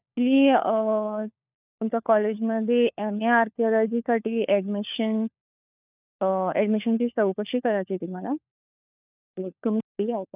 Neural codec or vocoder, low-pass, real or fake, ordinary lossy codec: codec, 16 kHz, 4 kbps, FunCodec, trained on LibriTTS, 50 frames a second; 3.6 kHz; fake; none